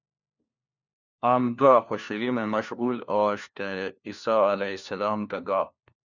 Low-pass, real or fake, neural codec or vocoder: 7.2 kHz; fake; codec, 16 kHz, 1 kbps, FunCodec, trained on LibriTTS, 50 frames a second